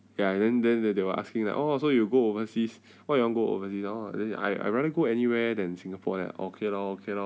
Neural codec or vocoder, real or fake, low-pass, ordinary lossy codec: none; real; none; none